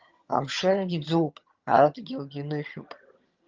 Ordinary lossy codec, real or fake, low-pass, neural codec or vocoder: Opus, 32 kbps; fake; 7.2 kHz; vocoder, 22.05 kHz, 80 mel bands, HiFi-GAN